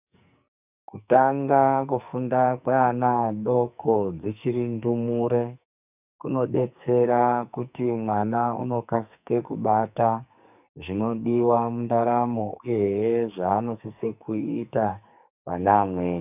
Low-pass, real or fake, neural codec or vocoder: 3.6 kHz; fake; codec, 44.1 kHz, 2.6 kbps, SNAC